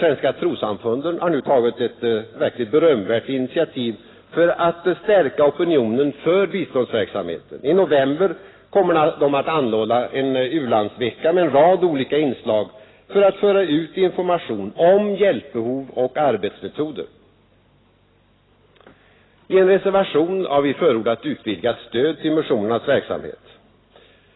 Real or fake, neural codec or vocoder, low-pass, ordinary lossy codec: real; none; 7.2 kHz; AAC, 16 kbps